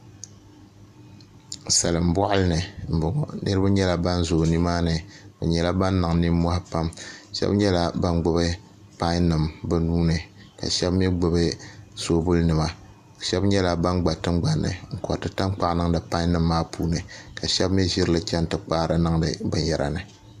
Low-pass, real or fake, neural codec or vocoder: 14.4 kHz; real; none